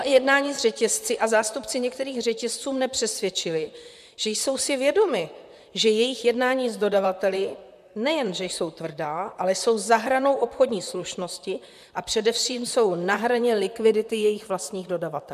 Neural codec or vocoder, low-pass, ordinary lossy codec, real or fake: vocoder, 44.1 kHz, 128 mel bands, Pupu-Vocoder; 14.4 kHz; MP3, 96 kbps; fake